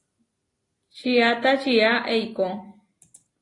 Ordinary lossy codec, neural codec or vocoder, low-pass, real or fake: AAC, 32 kbps; none; 10.8 kHz; real